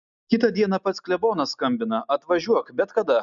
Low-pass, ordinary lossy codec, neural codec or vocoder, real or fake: 7.2 kHz; MP3, 96 kbps; none; real